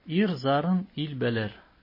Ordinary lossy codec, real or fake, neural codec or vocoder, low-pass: MP3, 24 kbps; real; none; 5.4 kHz